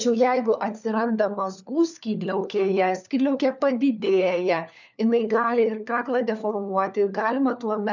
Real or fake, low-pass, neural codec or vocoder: fake; 7.2 kHz; codec, 16 kHz, 4 kbps, FunCodec, trained on LibriTTS, 50 frames a second